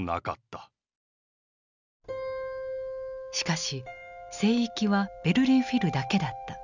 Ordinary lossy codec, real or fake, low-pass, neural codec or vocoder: none; real; 7.2 kHz; none